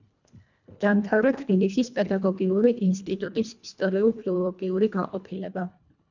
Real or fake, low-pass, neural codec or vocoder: fake; 7.2 kHz; codec, 24 kHz, 1.5 kbps, HILCodec